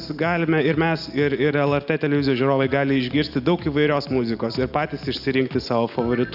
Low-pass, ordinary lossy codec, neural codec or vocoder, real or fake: 5.4 kHz; Opus, 64 kbps; none; real